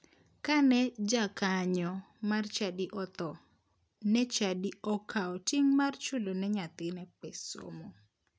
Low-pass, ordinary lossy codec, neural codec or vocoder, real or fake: none; none; none; real